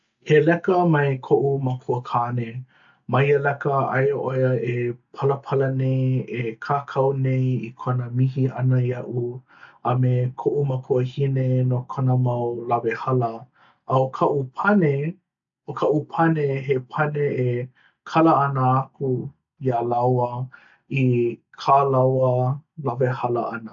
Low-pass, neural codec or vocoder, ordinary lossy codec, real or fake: 7.2 kHz; none; AAC, 64 kbps; real